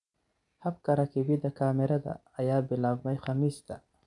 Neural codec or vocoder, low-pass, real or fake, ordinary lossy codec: none; 10.8 kHz; real; none